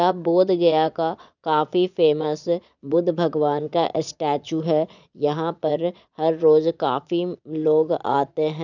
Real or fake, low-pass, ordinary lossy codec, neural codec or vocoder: fake; 7.2 kHz; none; vocoder, 22.05 kHz, 80 mel bands, Vocos